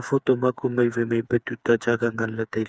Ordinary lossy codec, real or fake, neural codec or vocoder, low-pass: none; fake; codec, 16 kHz, 4 kbps, FreqCodec, smaller model; none